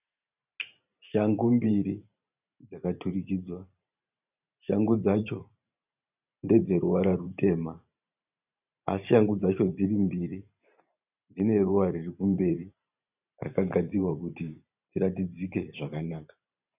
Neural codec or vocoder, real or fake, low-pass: vocoder, 44.1 kHz, 128 mel bands every 512 samples, BigVGAN v2; fake; 3.6 kHz